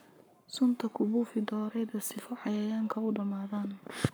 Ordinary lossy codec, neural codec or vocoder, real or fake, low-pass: none; codec, 44.1 kHz, 7.8 kbps, Pupu-Codec; fake; none